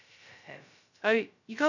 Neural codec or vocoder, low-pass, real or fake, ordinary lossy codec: codec, 16 kHz, 0.2 kbps, FocalCodec; 7.2 kHz; fake; none